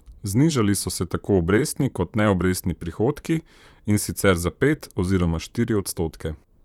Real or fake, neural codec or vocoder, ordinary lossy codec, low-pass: fake; vocoder, 44.1 kHz, 128 mel bands, Pupu-Vocoder; none; 19.8 kHz